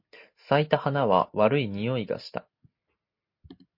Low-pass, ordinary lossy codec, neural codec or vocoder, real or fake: 5.4 kHz; MP3, 32 kbps; none; real